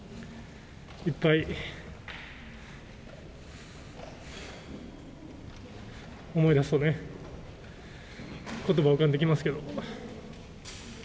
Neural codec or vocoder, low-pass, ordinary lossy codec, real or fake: none; none; none; real